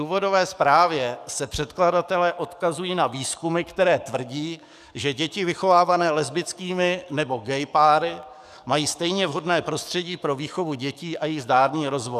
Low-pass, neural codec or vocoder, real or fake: 14.4 kHz; codec, 44.1 kHz, 7.8 kbps, DAC; fake